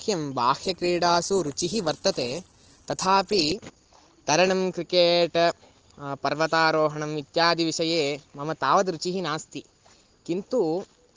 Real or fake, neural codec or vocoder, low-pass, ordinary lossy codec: real; none; 7.2 kHz; Opus, 16 kbps